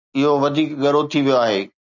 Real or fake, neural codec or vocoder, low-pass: real; none; 7.2 kHz